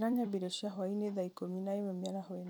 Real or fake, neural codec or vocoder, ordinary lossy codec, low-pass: fake; vocoder, 44.1 kHz, 128 mel bands every 256 samples, BigVGAN v2; none; none